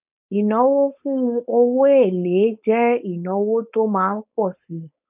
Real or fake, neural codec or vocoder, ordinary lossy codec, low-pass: fake; codec, 16 kHz, 4.8 kbps, FACodec; none; 3.6 kHz